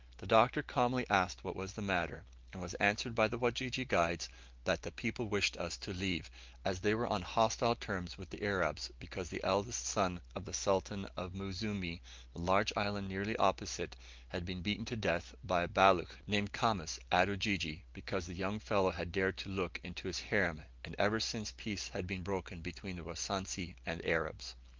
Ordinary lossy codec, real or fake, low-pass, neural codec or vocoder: Opus, 32 kbps; real; 7.2 kHz; none